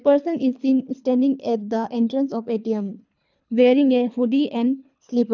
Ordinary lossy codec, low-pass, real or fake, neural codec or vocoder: none; 7.2 kHz; fake; codec, 24 kHz, 3 kbps, HILCodec